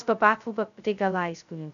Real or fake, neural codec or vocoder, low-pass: fake; codec, 16 kHz, 0.2 kbps, FocalCodec; 7.2 kHz